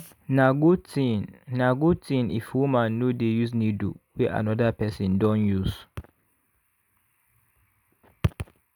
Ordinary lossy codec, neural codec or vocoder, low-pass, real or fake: none; none; none; real